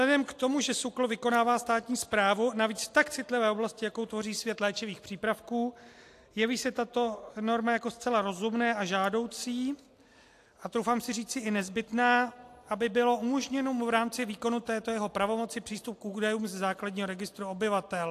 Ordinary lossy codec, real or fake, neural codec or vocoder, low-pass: AAC, 64 kbps; real; none; 14.4 kHz